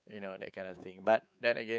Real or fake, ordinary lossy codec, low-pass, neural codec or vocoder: fake; none; none; codec, 16 kHz, 4 kbps, X-Codec, WavLM features, trained on Multilingual LibriSpeech